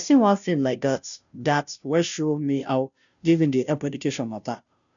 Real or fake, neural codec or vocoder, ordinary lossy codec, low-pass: fake; codec, 16 kHz, 0.5 kbps, FunCodec, trained on Chinese and English, 25 frames a second; none; 7.2 kHz